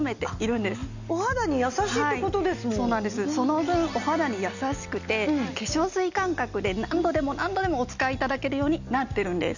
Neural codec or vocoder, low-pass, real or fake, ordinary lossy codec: none; 7.2 kHz; real; none